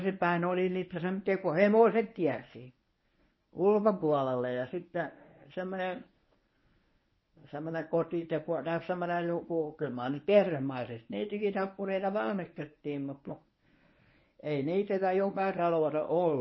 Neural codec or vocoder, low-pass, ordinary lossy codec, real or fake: codec, 24 kHz, 0.9 kbps, WavTokenizer, medium speech release version 1; 7.2 kHz; MP3, 24 kbps; fake